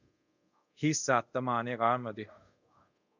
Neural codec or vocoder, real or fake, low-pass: codec, 24 kHz, 0.5 kbps, DualCodec; fake; 7.2 kHz